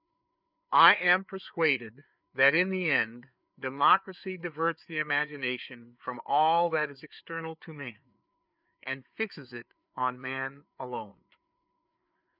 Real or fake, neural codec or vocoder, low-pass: fake; codec, 16 kHz, 4 kbps, FreqCodec, larger model; 5.4 kHz